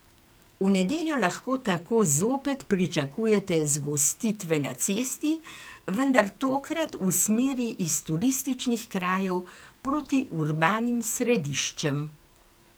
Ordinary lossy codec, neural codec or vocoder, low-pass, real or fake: none; codec, 44.1 kHz, 2.6 kbps, SNAC; none; fake